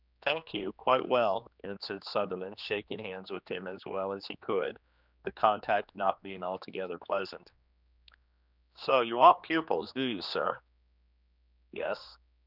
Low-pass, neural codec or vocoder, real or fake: 5.4 kHz; codec, 16 kHz, 2 kbps, X-Codec, HuBERT features, trained on general audio; fake